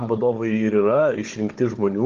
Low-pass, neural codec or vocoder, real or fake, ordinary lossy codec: 7.2 kHz; codec, 16 kHz, 16 kbps, FunCodec, trained on Chinese and English, 50 frames a second; fake; Opus, 16 kbps